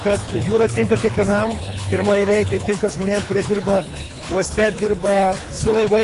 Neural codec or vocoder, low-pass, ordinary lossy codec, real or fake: codec, 24 kHz, 3 kbps, HILCodec; 10.8 kHz; AAC, 48 kbps; fake